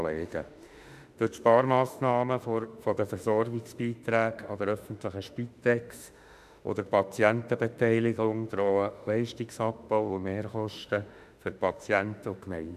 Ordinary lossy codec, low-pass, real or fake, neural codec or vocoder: none; 14.4 kHz; fake; autoencoder, 48 kHz, 32 numbers a frame, DAC-VAE, trained on Japanese speech